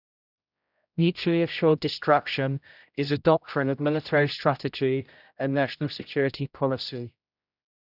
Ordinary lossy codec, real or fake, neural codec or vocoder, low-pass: none; fake; codec, 16 kHz, 0.5 kbps, X-Codec, HuBERT features, trained on general audio; 5.4 kHz